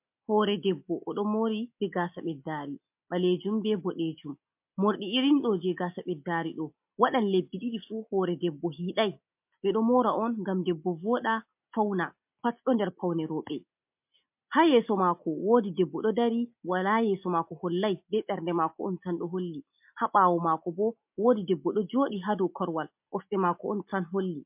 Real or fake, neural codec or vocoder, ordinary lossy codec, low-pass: real; none; MP3, 32 kbps; 3.6 kHz